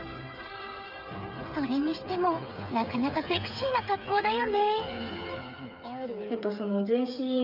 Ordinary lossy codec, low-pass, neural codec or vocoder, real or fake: none; 5.4 kHz; codec, 16 kHz, 8 kbps, FreqCodec, smaller model; fake